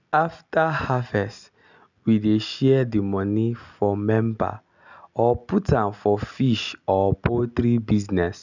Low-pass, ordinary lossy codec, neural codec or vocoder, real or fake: 7.2 kHz; none; none; real